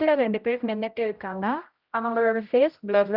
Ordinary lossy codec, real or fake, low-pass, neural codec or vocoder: Opus, 32 kbps; fake; 5.4 kHz; codec, 16 kHz, 0.5 kbps, X-Codec, HuBERT features, trained on general audio